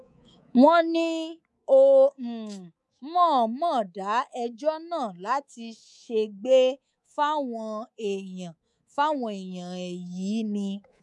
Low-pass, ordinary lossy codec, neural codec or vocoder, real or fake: none; none; codec, 24 kHz, 3.1 kbps, DualCodec; fake